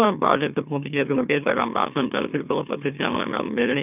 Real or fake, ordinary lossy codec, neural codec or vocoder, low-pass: fake; none; autoencoder, 44.1 kHz, a latent of 192 numbers a frame, MeloTTS; 3.6 kHz